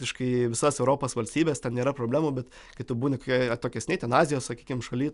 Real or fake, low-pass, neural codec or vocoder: real; 10.8 kHz; none